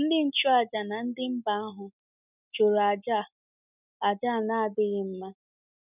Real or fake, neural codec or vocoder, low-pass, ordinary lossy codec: real; none; 3.6 kHz; none